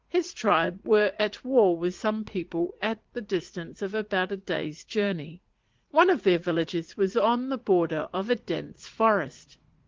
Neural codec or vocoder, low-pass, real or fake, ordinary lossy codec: vocoder, 44.1 kHz, 80 mel bands, Vocos; 7.2 kHz; fake; Opus, 16 kbps